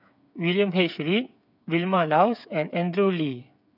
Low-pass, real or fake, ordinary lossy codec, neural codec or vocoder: 5.4 kHz; fake; none; codec, 16 kHz, 8 kbps, FreqCodec, smaller model